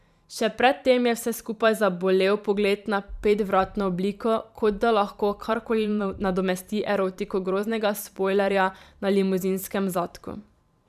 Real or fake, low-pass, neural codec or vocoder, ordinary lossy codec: fake; 14.4 kHz; vocoder, 44.1 kHz, 128 mel bands every 512 samples, BigVGAN v2; none